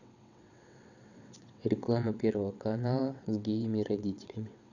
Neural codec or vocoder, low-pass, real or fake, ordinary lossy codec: vocoder, 22.05 kHz, 80 mel bands, WaveNeXt; 7.2 kHz; fake; none